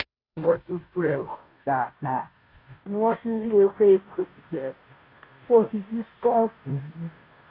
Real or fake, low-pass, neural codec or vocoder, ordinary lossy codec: fake; 5.4 kHz; codec, 16 kHz, 0.5 kbps, FunCodec, trained on Chinese and English, 25 frames a second; AAC, 32 kbps